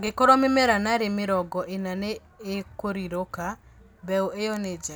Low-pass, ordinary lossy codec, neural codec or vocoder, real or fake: none; none; none; real